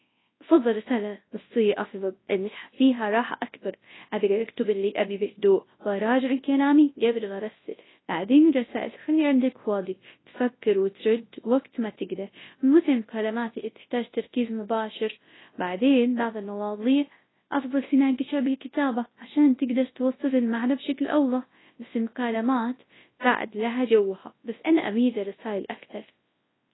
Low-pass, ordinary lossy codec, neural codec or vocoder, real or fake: 7.2 kHz; AAC, 16 kbps; codec, 24 kHz, 0.9 kbps, WavTokenizer, large speech release; fake